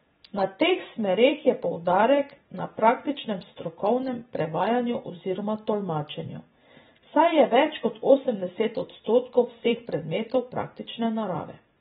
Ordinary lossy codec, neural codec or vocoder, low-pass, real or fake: AAC, 16 kbps; vocoder, 44.1 kHz, 128 mel bands every 256 samples, BigVGAN v2; 19.8 kHz; fake